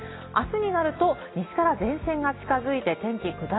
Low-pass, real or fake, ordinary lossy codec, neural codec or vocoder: 7.2 kHz; real; AAC, 16 kbps; none